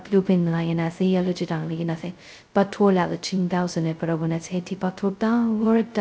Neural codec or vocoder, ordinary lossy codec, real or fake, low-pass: codec, 16 kHz, 0.2 kbps, FocalCodec; none; fake; none